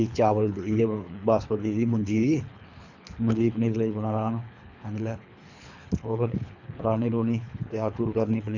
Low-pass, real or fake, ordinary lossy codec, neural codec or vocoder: 7.2 kHz; fake; none; codec, 24 kHz, 3 kbps, HILCodec